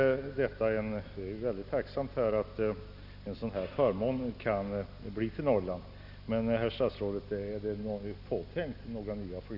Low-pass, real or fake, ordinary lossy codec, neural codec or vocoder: 5.4 kHz; real; none; none